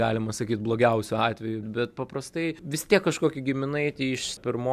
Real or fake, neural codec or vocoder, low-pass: real; none; 14.4 kHz